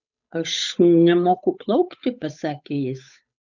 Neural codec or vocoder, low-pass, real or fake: codec, 16 kHz, 8 kbps, FunCodec, trained on Chinese and English, 25 frames a second; 7.2 kHz; fake